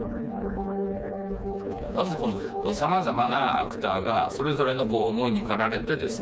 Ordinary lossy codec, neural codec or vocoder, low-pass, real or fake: none; codec, 16 kHz, 2 kbps, FreqCodec, smaller model; none; fake